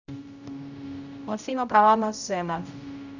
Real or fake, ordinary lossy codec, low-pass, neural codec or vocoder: fake; none; 7.2 kHz; codec, 16 kHz, 0.5 kbps, X-Codec, HuBERT features, trained on general audio